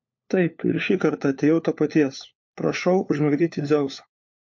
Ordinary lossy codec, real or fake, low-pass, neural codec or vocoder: MP3, 48 kbps; fake; 7.2 kHz; codec, 16 kHz, 4 kbps, FunCodec, trained on LibriTTS, 50 frames a second